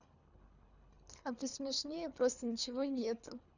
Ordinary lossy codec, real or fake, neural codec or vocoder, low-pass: none; fake; codec, 24 kHz, 3 kbps, HILCodec; 7.2 kHz